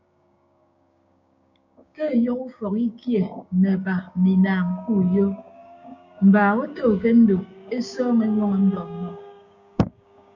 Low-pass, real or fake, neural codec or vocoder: 7.2 kHz; fake; codec, 16 kHz, 6 kbps, DAC